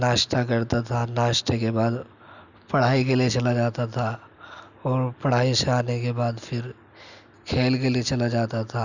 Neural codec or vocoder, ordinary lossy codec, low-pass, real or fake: none; none; 7.2 kHz; real